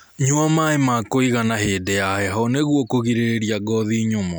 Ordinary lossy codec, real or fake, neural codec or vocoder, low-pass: none; real; none; none